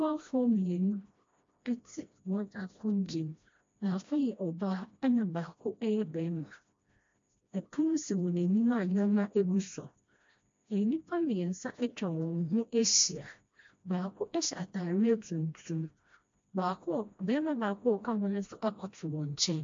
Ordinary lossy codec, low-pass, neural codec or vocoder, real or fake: MP3, 48 kbps; 7.2 kHz; codec, 16 kHz, 1 kbps, FreqCodec, smaller model; fake